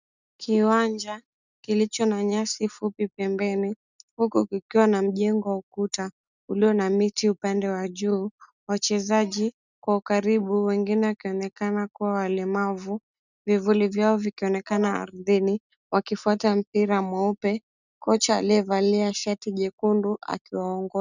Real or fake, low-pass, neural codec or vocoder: real; 7.2 kHz; none